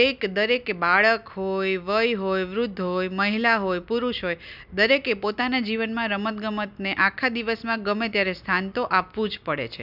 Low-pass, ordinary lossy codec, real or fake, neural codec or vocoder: 5.4 kHz; none; real; none